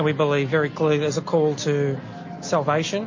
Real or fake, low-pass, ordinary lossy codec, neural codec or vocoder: real; 7.2 kHz; MP3, 32 kbps; none